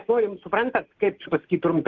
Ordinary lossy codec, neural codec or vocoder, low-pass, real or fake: Opus, 16 kbps; none; 7.2 kHz; real